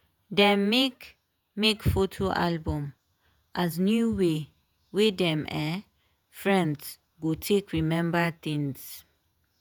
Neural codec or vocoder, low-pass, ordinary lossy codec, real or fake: vocoder, 48 kHz, 128 mel bands, Vocos; none; none; fake